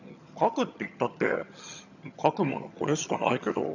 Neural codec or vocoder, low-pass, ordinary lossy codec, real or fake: vocoder, 22.05 kHz, 80 mel bands, HiFi-GAN; 7.2 kHz; none; fake